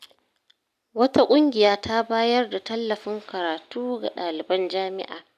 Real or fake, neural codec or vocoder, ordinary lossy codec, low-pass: real; none; none; 14.4 kHz